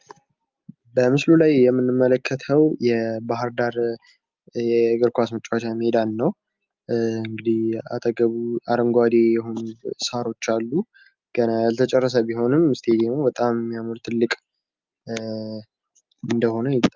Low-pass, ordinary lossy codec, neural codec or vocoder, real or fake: 7.2 kHz; Opus, 32 kbps; none; real